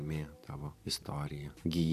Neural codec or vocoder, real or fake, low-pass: vocoder, 44.1 kHz, 128 mel bands every 512 samples, BigVGAN v2; fake; 14.4 kHz